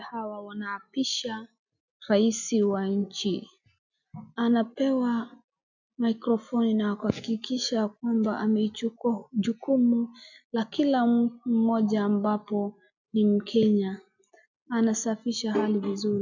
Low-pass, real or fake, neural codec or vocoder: 7.2 kHz; real; none